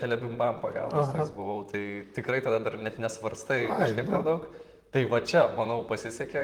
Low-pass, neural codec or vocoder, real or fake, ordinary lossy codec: 19.8 kHz; vocoder, 44.1 kHz, 128 mel bands, Pupu-Vocoder; fake; Opus, 24 kbps